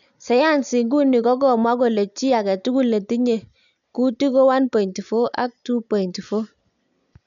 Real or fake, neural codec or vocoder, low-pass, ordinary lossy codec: real; none; 7.2 kHz; none